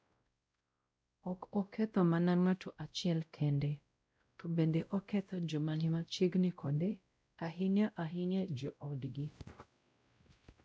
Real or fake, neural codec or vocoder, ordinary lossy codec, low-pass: fake; codec, 16 kHz, 0.5 kbps, X-Codec, WavLM features, trained on Multilingual LibriSpeech; none; none